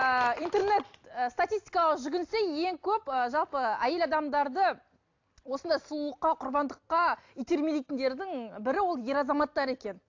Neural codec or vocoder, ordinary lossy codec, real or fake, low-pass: none; AAC, 48 kbps; real; 7.2 kHz